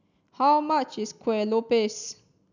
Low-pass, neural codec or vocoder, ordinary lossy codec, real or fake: 7.2 kHz; none; none; real